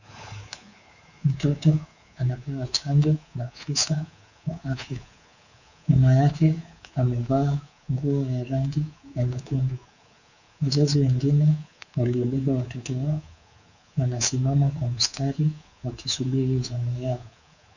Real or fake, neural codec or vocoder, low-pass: fake; codec, 24 kHz, 3.1 kbps, DualCodec; 7.2 kHz